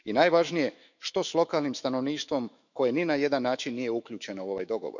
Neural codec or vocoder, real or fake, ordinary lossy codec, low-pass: autoencoder, 48 kHz, 128 numbers a frame, DAC-VAE, trained on Japanese speech; fake; none; 7.2 kHz